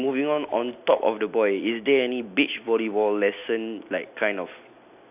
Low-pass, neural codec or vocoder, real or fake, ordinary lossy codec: 3.6 kHz; none; real; none